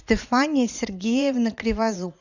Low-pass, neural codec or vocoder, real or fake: 7.2 kHz; vocoder, 44.1 kHz, 80 mel bands, Vocos; fake